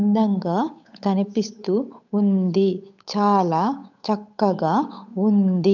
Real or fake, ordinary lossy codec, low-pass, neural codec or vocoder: fake; none; 7.2 kHz; codec, 16 kHz, 8 kbps, FunCodec, trained on Chinese and English, 25 frames a second